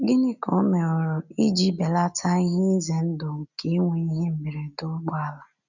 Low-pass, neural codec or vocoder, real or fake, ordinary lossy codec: 7.2 kHz; none; real; none